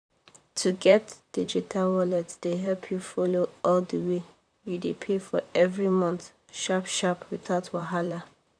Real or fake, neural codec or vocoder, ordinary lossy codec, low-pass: fake; vocoder, 44.1 kHz, 128 mel bands, Pupu-Vocoder; none; 9.9 kHz